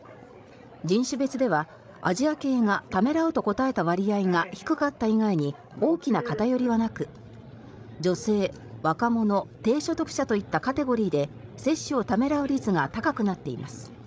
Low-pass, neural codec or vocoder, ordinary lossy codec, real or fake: none; codec, 16 kHz, 16 kbps, FreqCodec, larger model; none; fake